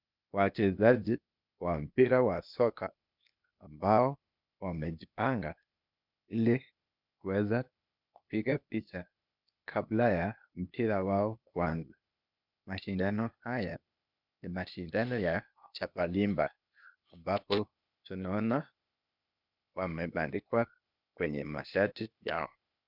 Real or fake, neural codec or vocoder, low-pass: fake; codec, 16 kHz, 0.8 kbps, ZipCodec; 5.4 kHz